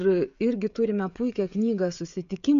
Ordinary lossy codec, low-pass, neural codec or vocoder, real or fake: MP3, 64 kbps; 7.2 kHz; codec, 16 kHz, 16 kbps, FreqCodec, smaller model; fake